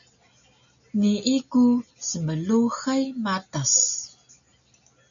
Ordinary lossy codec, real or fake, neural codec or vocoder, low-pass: MP3, 96 kbps; real; none; 7.2 kHz